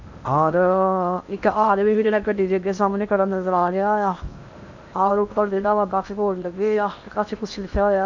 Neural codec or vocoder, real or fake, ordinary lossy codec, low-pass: codec, 16 kHz in and 24 kHz out, 0.8 kbps, FocalCodec, streaming, 65536 codes; fake; none; 7.2 kHz